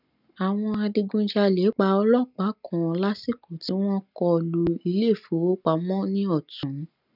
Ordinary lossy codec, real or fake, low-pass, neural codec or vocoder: none; real; 5.4 kHz; none